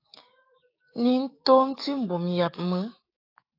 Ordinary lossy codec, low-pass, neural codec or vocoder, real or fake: AAC, 32 kbps; 5.4 kHz; codec, 16 kHz, 6 kbps, DAC; fake